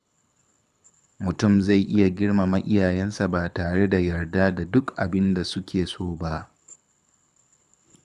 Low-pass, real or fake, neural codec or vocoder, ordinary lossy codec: none; fake; codec, 24 kHz, 6 kbps, HILCodec; none